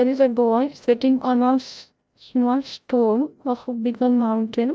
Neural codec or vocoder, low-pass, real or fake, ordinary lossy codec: codec, 16 kHz, 0.5 kbps, FreqCodec, larger model; none; fake; none